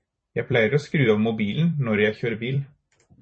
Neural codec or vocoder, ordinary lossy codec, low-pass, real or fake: vocoder, 44.1 kHz, 128 mel bands every 256 samples, BigVGAN v2; MP3, 32 kbps; 9.9 kHz; fake